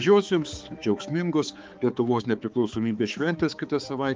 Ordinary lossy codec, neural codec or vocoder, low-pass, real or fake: Opus, 32 kbps; codec, 16 kHz, 4 kbps, X-Codec, HuBERT features, trained on balanced general audio; 7.2 kHz; fake